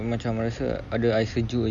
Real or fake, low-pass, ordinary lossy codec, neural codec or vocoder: real; none; none; none